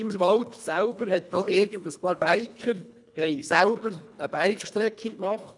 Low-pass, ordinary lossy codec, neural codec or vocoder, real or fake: 10.8 kHz; none; codec, 24 kHz, 1.5 kbps, HILCodec; fake